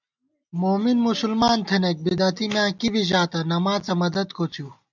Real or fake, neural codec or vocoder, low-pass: real; none; 7.2 kHz